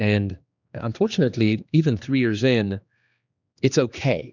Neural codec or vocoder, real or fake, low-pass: codec, 16 kHz, 2 kbps, X-Codec, HuBERT features, trained on general audio; fake; 7.2 kHz